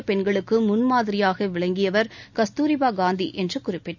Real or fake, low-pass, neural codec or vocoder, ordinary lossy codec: real; 7.2 kHz; none; none